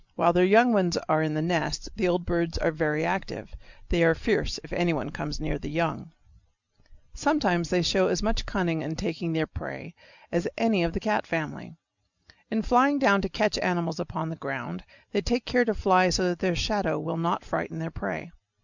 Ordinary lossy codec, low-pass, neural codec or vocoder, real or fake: Opus, 64 kbps; 7.2 kHz; none; real